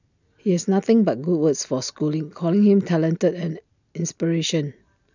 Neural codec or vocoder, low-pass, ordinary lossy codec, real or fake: none; 7.2 kHz; none; real